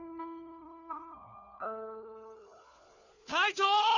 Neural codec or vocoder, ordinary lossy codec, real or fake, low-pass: codec, 16 kHz, 4 kbps, FunCodec, trained on LibriTTS, 50 frames a second; none; fake; 7.2 kHz